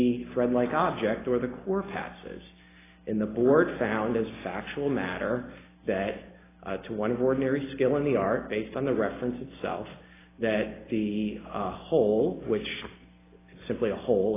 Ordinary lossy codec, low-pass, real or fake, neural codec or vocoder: AAC, 16 kbps; 3.6 kHz; real; none